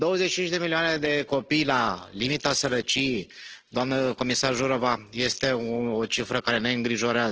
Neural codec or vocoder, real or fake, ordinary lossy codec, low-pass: none; real; Opus, 16 kbps; 7.2 kHz